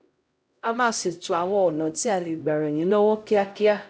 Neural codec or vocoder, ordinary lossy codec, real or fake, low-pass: codec, 16 kHz, 0.5 kbps, X-Codec, HuBERT features, trained on LibriSpeech; none; fake; none